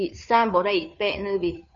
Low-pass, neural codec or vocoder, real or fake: 7.2 kHz; codec, 16 kHz, 2 kbps, FunCodec, trained on Chinese and English, 25 frames a second; fake